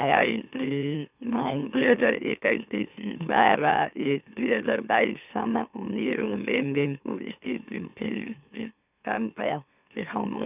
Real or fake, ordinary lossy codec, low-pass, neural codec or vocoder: fake; none; 3.6 kHz; autoencoder, 44.1 kHz, a latent of 192 numbers a frame, MeloTTS